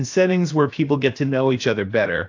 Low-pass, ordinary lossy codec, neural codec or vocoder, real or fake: 7.2 kHz; AAC, 48 kbps; codec, 16 kHz, 0.7 kbps, FocalCodec; fake